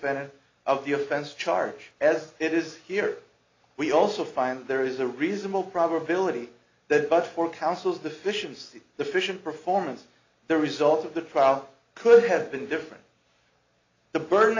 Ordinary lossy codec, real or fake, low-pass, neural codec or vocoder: AAC, 48 kbps; real; 7.2 kHz; none